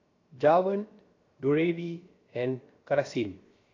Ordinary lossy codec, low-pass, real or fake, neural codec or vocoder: AAC, 32 kbps; 7.2 kHz; fake; codec, 16 kHz, 0.7 kbps, FocalCodec